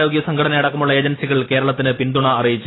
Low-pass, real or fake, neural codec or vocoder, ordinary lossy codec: 7.2 kHz; real; none; AAC, 16 kbps